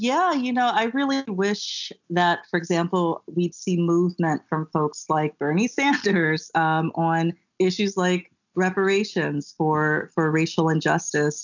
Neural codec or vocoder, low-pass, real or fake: none; 7.2 kHz; real